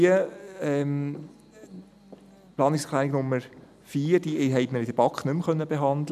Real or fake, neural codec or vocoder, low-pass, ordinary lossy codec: real; none; 14.4 kHz; none